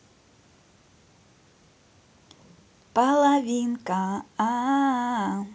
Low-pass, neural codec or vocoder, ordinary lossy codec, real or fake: none; none; none; real